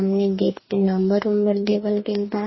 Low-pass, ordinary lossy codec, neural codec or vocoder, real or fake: 7.2 kHz; MP3, 24 kbps; codec, 44.1 kHz, 2.6 kbps, DAC; fake